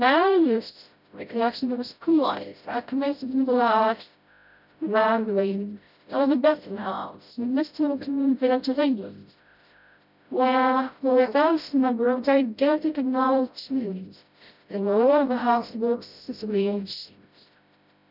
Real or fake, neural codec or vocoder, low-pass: fake; codec, 16 kHz, 0.5 kbps, FreqCodec, smaller model; 5.4 kHz